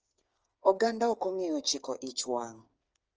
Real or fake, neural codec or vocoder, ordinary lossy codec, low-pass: fake; codec, 16 kHz, 8 kbps, FreqCodec, smaller model; Opus, 24 kbps; 7.2 kHz